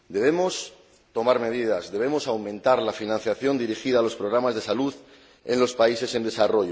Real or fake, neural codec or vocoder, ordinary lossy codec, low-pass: real; none; none; none